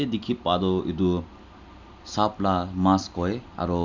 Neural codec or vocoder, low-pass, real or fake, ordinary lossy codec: none; 7.2 kHz; real; none